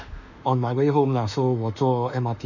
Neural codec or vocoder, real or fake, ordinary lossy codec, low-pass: autoencoder, 48 kHz, 32 numbers a frame, DAC-VAE, trained on Japanese speech; fake; none; 7.2 kHz